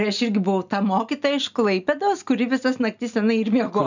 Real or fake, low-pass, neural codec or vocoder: real; 7.2 kHz; none